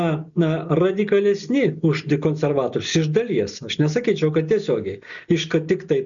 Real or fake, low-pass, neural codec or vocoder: real; 7.2 kHz; none